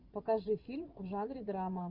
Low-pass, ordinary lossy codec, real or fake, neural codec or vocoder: 5.4 kHz; Opus, 32 kbps; fake; vocoder, 24 kHz, 100 mel bands, Vocos